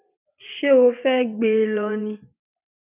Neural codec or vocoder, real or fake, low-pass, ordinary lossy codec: vocoder, 22.05 kHz, 80 mel bands, WaveNeXt; fake; 3.6 kHz; none